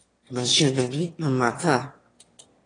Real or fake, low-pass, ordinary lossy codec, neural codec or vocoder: fake; 9.9 kHz; AAC, 32 kbps; autoencoder, 22.05 kHz, a latent of 192 numbers a frame, VITS, trained on one speaker